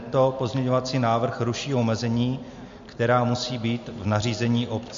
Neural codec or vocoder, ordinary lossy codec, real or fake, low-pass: none; MP3, 48 kbps; real; 7.2 kHz